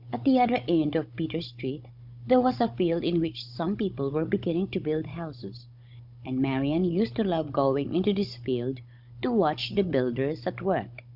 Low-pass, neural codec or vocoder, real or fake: 5.4 kHz; codec, 16 kHz, 16 kbps, FunCodec, trained on Chinese and English, 50 frames a second; fake